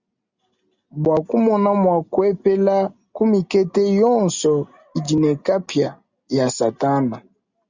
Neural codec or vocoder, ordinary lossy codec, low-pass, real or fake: none; Opus, 64 kbps; 7.2 kHz; real